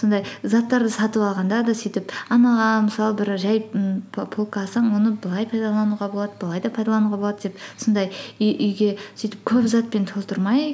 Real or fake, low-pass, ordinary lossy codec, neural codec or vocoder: real; none; none; none